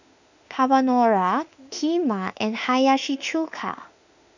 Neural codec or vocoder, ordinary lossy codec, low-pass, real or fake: autoencoder, 48 kHz, 32 numbers a frame, DAC-VAE, trained on Japanese speech; none; 7.2 kHz; fake